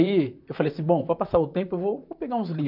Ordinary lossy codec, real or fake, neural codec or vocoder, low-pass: none; fake; vocoder, 44.1 kHz, 128 mel bands, Pupu-Vocoder; 5.4 kHz